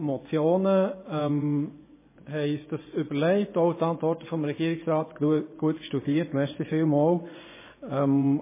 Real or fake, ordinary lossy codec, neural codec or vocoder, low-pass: fake; MP3, 16 kbps; vocoder, 24 kHz, 100 mel bands, Vocos; 3.6 kHz